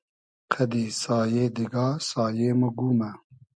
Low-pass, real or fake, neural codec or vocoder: 9.9 kHz; real; none